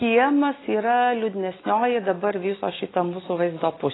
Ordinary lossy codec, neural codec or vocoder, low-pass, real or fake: AAC, 16 kbps; none; 7.2 kHz; real